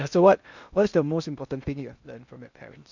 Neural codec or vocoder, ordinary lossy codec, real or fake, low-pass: codec, 16 kHz in and 24 kHz out, 0.8 kbps, FocalCodec, streaming, 65536 codes; none; fake; 7.2 kHz